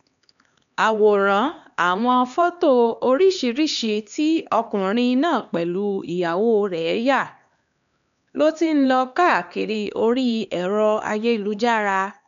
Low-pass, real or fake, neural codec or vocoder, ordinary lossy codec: 7.2 kHz; fake; codec, 16 kHz, 2 kbps, X-Codec, HuBERT features, trained on LibriSpeech; none